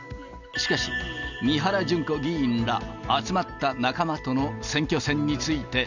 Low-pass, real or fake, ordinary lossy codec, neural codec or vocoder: 7.2 kHz; real; none; none